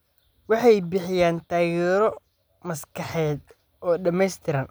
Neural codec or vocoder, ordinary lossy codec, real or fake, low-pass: none; none; real; none